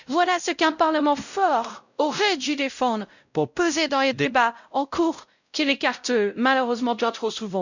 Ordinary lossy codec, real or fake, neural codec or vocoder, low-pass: none; fake; codec, 16 kHz, 0.5 kbps, X-Codec, WavLM features, trained on Multilingual LibriSpeech; 7.2 kHz